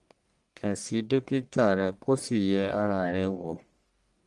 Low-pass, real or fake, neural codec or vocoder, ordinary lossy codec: 10.8 kHz; fake; codec, 44.1 kHz, 1.7 kbps, Pupu-Codec; Opus, 32 kbps